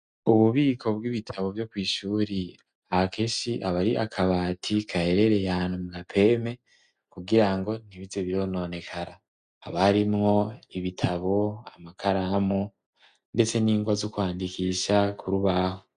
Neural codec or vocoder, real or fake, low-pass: none; real; 9.9 kHz